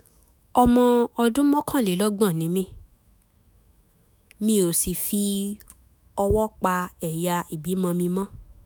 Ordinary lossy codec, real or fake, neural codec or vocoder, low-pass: none; fake; autoencoder, 48 kHz, 128 numbers a frame, DAC-VAE, trained on Japanese speech; none